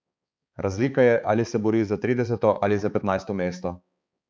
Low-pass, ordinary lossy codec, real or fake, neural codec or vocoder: none; none; fake; codec, 16 kHz, 4 kbps, X-Codec, HuBERT features, trained on balanced general audio